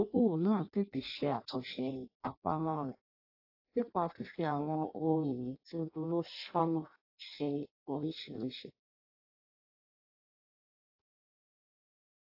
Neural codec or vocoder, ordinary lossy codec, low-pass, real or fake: codec, 16 kHz in and 24 kHz out, 0.6 kbps, FireRedTTS-2 codec; AAC, 32 kbps; 5.4 kHz; fake